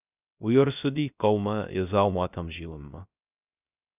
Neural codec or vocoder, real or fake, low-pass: codec, 16 kHz, 0.3 kbps, FocalCodec; fake; 3.6 kHz